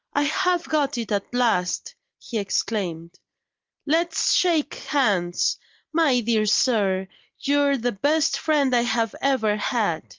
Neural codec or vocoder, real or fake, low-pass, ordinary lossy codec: none; real; 7.2 kHz; Opus, 32 kbps